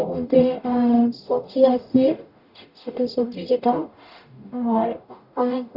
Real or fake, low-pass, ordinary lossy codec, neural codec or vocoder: fake; 5.4 kHz; none; codec, 44.1 kHz, 0.9 kbps, DAC